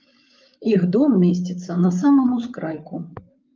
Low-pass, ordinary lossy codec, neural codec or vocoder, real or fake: 7.2 kHz; Opus, 32 kbps; codec, 16 kHz, 8 kbps, FreqCodec, larger model; fake